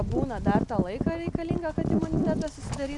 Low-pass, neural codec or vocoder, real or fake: 10.8 kHz; none; real